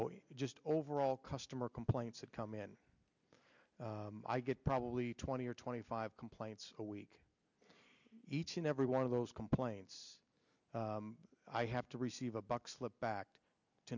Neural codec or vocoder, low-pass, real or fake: none; 7.2 kHz; real